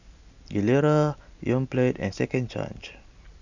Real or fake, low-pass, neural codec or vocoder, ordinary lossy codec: real; 7.2 kHz; none; none